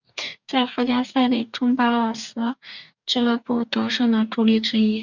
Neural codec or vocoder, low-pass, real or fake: codec, 44.1 kHz, 2.6 kbps, DAC; 7.2 kHz; fake